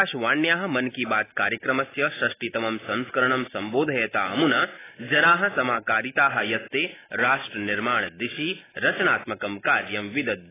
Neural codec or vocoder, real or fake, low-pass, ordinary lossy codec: none; real; 3.6 kHz; AAC, 16 kbps